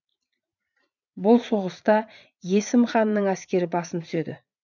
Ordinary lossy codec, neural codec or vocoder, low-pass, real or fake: none; vocoder, 44.1 kHz, 80 mel bands, Vocos; 7.2 kHz; fake